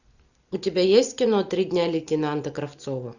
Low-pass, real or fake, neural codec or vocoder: 7.2 kHz; real; none